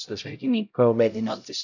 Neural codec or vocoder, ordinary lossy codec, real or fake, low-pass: codec, 16 kHz, 0.5 kbps, X-Codec, HuBERT features, trained on LibriSpeech; none; fake; 7.2 kHz